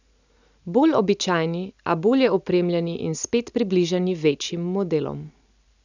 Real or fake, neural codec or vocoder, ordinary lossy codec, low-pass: real; none; none; 7.2 kHz